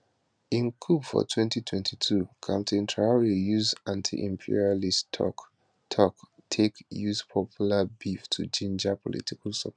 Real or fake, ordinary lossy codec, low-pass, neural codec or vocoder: real; none; 9.9 kHz; none